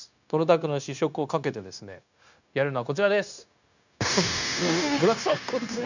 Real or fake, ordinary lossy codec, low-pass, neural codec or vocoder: fake; none; 7.2 kHz; codec, 16 kHz, 0.9 kbps, LongCat-Audio-Codec